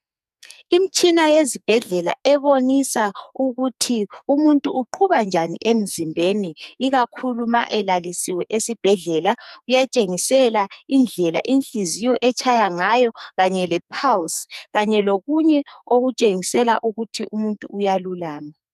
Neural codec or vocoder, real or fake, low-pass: codec, 44.1 kHz, 2.6 kbps, SNAC; fake; 14.4 kHz